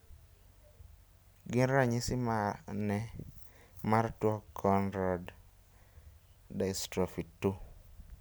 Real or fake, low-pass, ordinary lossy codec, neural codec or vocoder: real; none; none; none